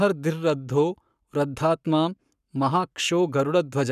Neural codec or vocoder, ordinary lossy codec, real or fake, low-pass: codec, 44.1 kHz, 7.8 kbps, Pupu-Codec; none; fake; 14.4 kHz